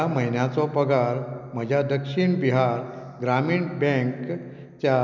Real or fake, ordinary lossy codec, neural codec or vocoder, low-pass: real; none; none; 7.2 kHz